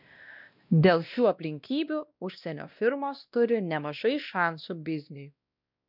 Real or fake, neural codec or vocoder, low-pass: fake; codec, 16 kHz, 1 kbps, X-Codec, WavLM features, trained on Multilingual LibriSpeech; 5.4 kHz